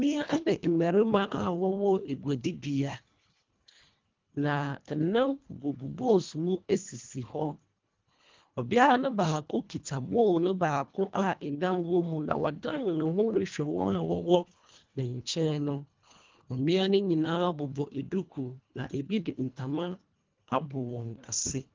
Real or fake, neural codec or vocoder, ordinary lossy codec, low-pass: fake; codec, 24 kHz, 1.5 kbps, HILCodec; Opus, 32 kbps; 7.2 kHz